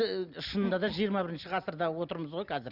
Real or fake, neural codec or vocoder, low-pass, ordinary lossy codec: real; none; 5.4 kHz; Opus, 64 kbps